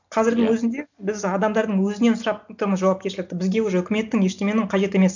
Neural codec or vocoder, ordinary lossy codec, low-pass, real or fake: none; none; 7.2 kHz; real